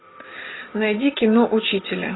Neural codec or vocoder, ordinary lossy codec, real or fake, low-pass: none; AAC, 16 kbps; real; 7.2 kHz